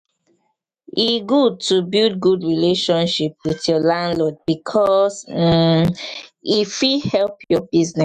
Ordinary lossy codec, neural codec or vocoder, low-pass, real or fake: none; autoencoder, 48 kHz, 128 numbers a frame, DAC-VAE, trained on Japanese speech; 14.4 kHz; fake